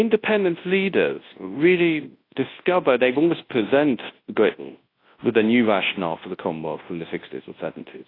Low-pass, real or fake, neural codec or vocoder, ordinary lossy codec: 5.4 kHz; fake; codec, 24 kHz, 0.9 kbps, WavTokenizer, large speech release; AAC, 24 kbps